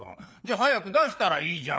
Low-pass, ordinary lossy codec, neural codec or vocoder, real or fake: none; none; codec, 16 kHz, 4 kbps, FreqCodec, larger model; fake